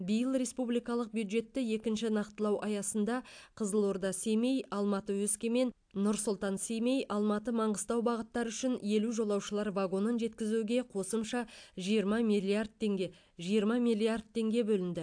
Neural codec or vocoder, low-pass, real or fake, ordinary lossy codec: none; 9.9 kHz; real; AAC, 64 kbps